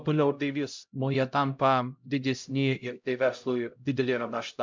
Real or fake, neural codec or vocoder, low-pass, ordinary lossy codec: fake; codec, 16 kHz, 0.5 kbps, X-Codec, HuBERT features, trained on LibriSpeech; 7.2 kHz; MP3, 64 kbps